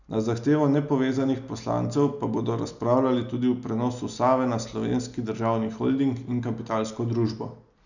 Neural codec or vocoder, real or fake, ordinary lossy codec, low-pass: none; real; none; 7.2 kHz